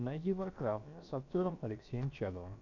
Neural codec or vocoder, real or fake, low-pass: codec, 16 kHz, about 1 kbps, DyCAST, with the encoder's durations; fake; 7.2 kHz